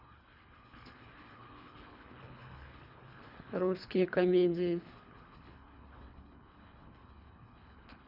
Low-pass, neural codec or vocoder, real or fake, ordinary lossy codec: 5.4 kHz; codec, 24 kHz, 3 kbps, HILCodec; fake; none